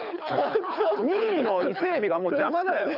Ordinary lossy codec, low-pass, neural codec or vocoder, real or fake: none; 5.4 kHz; codec, 24 kHz, 6 kbps, HILCodec; fake